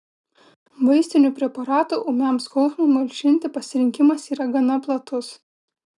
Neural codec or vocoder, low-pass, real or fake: none; 10.8 kHz; real